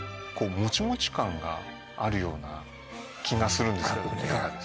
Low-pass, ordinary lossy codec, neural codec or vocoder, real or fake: none; none; none; real